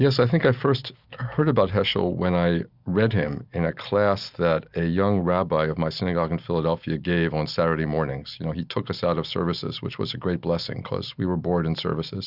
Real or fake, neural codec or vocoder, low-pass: real; none; 5.4 kHz